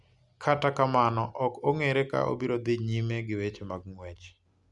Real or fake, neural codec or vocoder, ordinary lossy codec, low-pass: real; none; none; 10.8 kHz